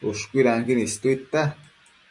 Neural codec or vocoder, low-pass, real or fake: none; 10.8 kHz; real